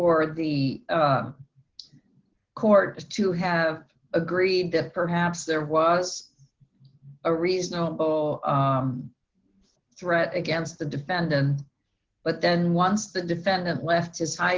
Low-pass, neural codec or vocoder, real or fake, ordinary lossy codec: 7.2 kHz; none; real; Opus, 16 kbps